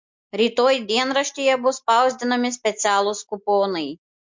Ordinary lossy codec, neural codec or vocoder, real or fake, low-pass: MP3, 48 kbps; none; real; 7.2 kHz